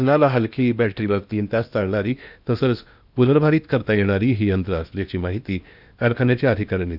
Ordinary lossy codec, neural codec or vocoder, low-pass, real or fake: none; codec, 16 kHz in and 24 kHz out, 0.8 kbps, FocalCodec, streaming, 65536 codes; 5.4 kHz; fake